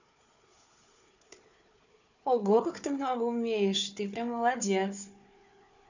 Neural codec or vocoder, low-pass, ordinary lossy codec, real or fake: codec, 16 kHz, 4 kbps, FunCodec, trained on Chinese and English, 50 frames a second; 7.2 kHz; none; fake